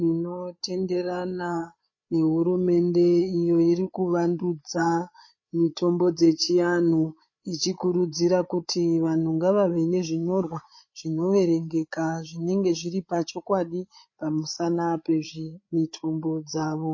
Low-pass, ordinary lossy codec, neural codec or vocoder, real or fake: 7.2 kHz; MP3, 32 kbps; codec, 16 kHz, 8 kbps, FreqCodec, larger model; fake